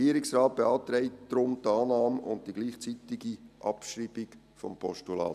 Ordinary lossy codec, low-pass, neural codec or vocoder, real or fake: none; 14.4 kHz; none; real